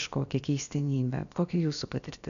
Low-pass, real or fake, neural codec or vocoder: 7.2 kHz; fake; codec, 16 kHz, about 1 kbps, DyCAST, with the encoder's durations